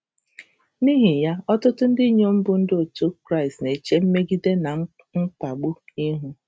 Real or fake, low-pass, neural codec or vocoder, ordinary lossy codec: real; none; none; none